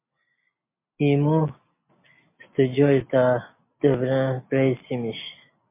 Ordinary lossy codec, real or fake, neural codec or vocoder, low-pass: MP3, 24 kbps; real; none; 3.6 kHz